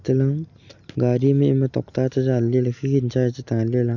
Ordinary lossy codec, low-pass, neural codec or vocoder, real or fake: none; 7.2 kHz; none; real